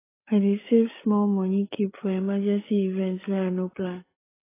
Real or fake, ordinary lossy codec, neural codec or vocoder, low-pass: fake; AAC, 16 kbps; codec, 16 kHz, 8 kbps, FreqCodec, larger model; 3.6 kHz